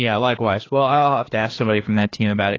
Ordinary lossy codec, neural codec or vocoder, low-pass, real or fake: AAC, 32 kbps; codec, 16 kHz, 2 kbps, FreqCodec, larger model; 7.2 kHz; fake